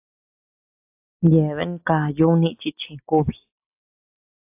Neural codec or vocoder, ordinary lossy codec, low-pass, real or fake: none; AAC, 32 kbps; 3.6 kHz; real